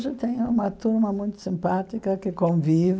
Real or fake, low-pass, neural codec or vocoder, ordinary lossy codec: real; none; none; none